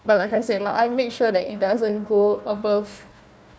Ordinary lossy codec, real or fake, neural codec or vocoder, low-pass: none; fake; codec, 16 kHz, 1 kbps, FunCodec, trained on Chinese and English, 50 frames a second; none